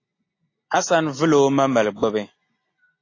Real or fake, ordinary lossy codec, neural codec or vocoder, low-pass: real; AAC, 32 kbps; none; 7.2 kHz